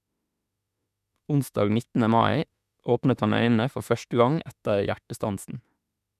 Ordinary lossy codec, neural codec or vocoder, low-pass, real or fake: none; autoencoder, 48 kHz, 32 numbers a frame, DAC-VAE, trained on Japanese speech; 14.4 kHz; fake